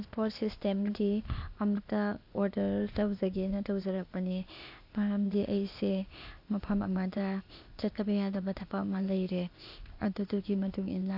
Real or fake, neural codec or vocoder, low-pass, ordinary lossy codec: fake; codec, 16 kHz, 0.8 kbps, ZipCodec; 5.4 kHz; AAC, 48 kbps